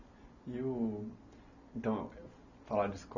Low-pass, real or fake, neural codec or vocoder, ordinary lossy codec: 7.2 kHz; real; none; none